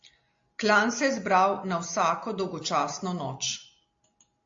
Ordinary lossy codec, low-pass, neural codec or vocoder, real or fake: AAC, 48 kbps; 7.2 kHz; none; real